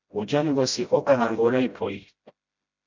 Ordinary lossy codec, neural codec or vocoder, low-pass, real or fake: MP3, 64 kbps; codec, 16 kHz, 0.5 kbps, FreqCodec, smaller model; 7.2 kHz; fake